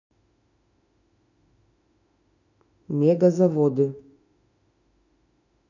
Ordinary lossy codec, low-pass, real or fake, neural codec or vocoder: none; 7.2 kHz; fake; autoencoder, 48 kHz, 32 numbers a frame, DAC-VAE, trained on Japanese speech